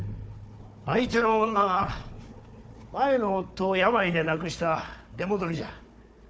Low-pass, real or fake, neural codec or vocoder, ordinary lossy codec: none; fake; codec, 16 kHz, 4 kbps, FunCodec, trained on Chinese and English, 50 frames a second; none